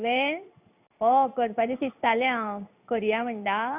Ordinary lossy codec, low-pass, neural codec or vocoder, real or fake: none; 3.6 kHz; codec, 16 kHz in and 24 kHz out, 1 kbps, XY-Tokenizer; fake